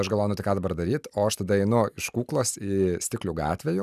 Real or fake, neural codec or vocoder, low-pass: real; none; 14.4 kHz